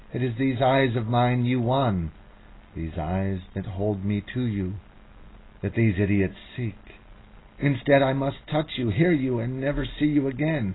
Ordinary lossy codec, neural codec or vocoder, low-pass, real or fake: AAC, 16 kbps; none; 7.2 kHz; real